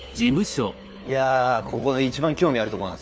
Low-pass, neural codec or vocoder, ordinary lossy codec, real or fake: none; codec, 16 kHz, 4 kbps, FunCodec, trained on LibriTTS, 50 frames a second; none; fake